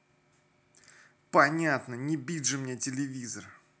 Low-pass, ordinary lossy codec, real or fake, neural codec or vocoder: none; none; real; none